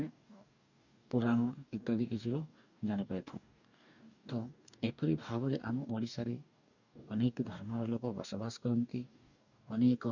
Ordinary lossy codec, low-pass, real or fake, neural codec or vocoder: none; 7.2 kHz; fake; codec, 44.1 kHz, 2.6 kbps, DAC